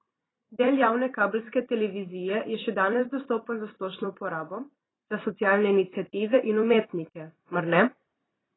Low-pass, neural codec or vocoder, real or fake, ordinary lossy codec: 7.2 kHz; vocoder, 44.1 kHz, 128 mel bands every 256 samples, BigVGAN v2; fake; AAC, 16 kbps